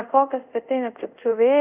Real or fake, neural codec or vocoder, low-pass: fake; codec, 24 kHz, 0.5 kbps, DualCodec; 3.6 kHz